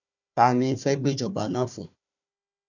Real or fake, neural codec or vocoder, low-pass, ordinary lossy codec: fake; codec, 16 kHz, 1 kbps, FunCodec, trained on Chinese and English, 50 frames a second; 7.2 kHz; none